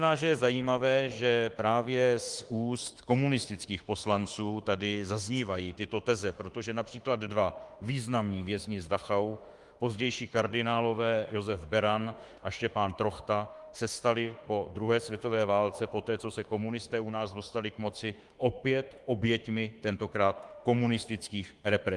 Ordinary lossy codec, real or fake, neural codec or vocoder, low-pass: Opus, 24 kbps; fake; autoencoder, 48 kHz, 32 numbers a frame, DAC-VAE, trained on Japanese speech; 10.8 kHz